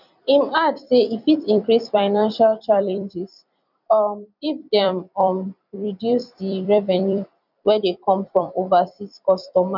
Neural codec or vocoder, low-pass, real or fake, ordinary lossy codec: vocoder, 44.1 kHz, 128 mel bands every 256 samples, BigVGAN v2; 5.4 kHz; fake; none